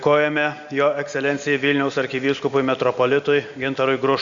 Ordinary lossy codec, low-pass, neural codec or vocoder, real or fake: Opus, 64 kbps; 7.2 kHz; none; real